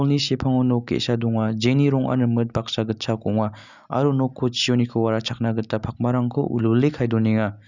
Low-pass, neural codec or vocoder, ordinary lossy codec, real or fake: 7.2 kHz; none; none; real